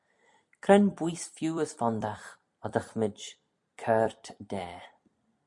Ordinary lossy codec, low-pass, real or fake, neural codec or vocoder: MP3, 64 kbps; 10.8 kHz; fake; vocoder, 44.1 kHz, 128 mel bands every 256 samples, BigVGAN v2